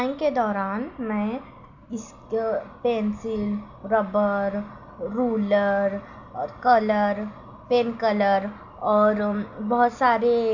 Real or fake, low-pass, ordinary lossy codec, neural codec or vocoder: real; 7.2 kHz; none; none